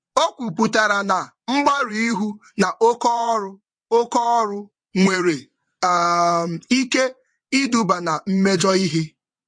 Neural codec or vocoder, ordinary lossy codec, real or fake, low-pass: vocoder, 22.05 kHz, 80 mel bands, WaveNeXt; MP3, 48 kbps; fake; 9.9 kHz